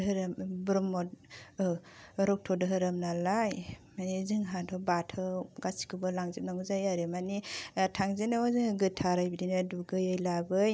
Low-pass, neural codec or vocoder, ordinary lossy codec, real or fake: none; none; none; real